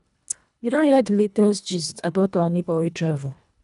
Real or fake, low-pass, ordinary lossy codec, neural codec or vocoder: fake; 10.8 kHz; none; codec, 24 kHz, 1.5 kbps, HILCodec